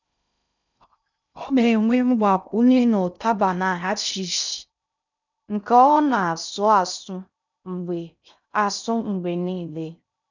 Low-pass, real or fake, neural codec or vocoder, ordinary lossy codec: 7.2 kHz; fake; codec, 16 kHz in and 24 kHz out, 0.6 kbps, FocalCodec, streaming, 2048 codes; none